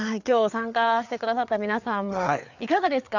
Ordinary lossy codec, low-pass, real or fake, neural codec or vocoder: none; 7.2 kHz; fake; codec, 16 kHz, 4 kbps, FreqCodec, larger model